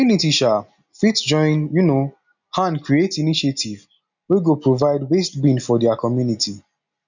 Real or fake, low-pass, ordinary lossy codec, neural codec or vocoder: real; 7.2 kHz; none; none